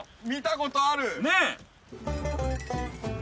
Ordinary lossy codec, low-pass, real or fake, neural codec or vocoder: none; none; real; none